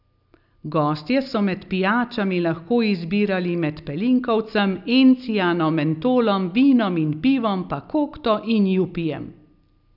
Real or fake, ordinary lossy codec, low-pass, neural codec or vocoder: real; none; 5.4 kHz; none